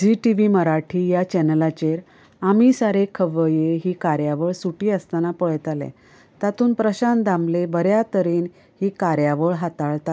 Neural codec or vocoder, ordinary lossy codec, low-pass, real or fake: none; none; none; real